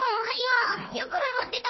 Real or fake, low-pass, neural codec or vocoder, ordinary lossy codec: fake; 7.2 kHz; codec, 16 kHz, 1 kbps, FunCodec, trained on Chinese and English, 50 frames a second; MP3, 24 kbps